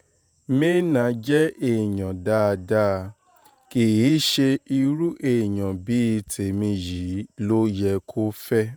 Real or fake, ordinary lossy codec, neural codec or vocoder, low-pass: fake; none; vocoder, 48 kHz, 128 mel bands, Vocos; none